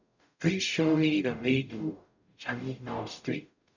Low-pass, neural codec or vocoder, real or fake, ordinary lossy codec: 7.2 kHz; codec, 44.1 kHz, 0.9 kbps, DAC; fake; none